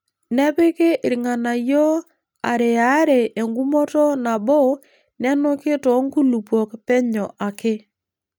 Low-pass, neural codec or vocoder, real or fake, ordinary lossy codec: none; none; real; none